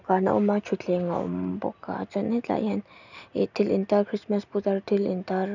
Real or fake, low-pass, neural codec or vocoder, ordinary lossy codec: real; 7.2 kHz; none; none